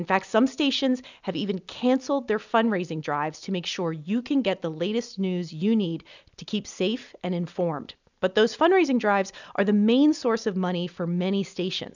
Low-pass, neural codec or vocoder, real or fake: 7.2 kHz; none; real